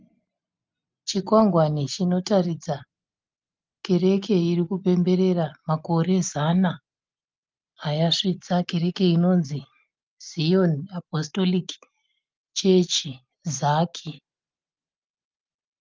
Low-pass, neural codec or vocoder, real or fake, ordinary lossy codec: 7.2 kHz; none; real; Opus, 32 kbps